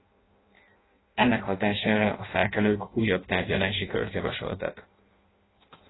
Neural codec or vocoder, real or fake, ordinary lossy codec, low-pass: codec, 16 kHz in and 24 kHz out, 0.6 kbps, FireRedTTS-2 codec; fake; AAC, 16 kbps; 7.2 kHz